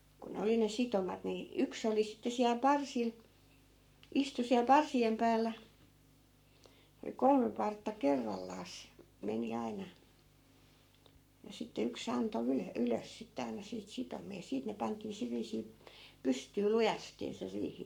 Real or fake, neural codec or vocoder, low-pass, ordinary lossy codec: fake; codec, 44.1 kHz, 7.8 kbps, Pupu-Codec; 19.8 kHz; none